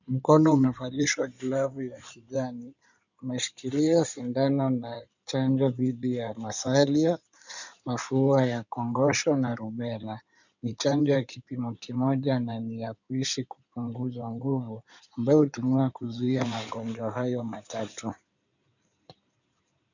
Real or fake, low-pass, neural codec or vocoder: fake; 7.2 kHz; codec, 16 kHz in and 24 kHz out, 2.2 kbps, FireRedTTS-2 codec